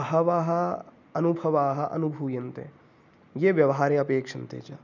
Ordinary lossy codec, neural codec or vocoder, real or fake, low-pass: none; none; real; 7.2 kHz